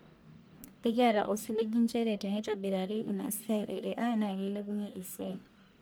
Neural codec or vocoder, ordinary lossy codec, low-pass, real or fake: codec, 44.1 kHz, 1.7 kbps, Pupu-Codec; none; none; fake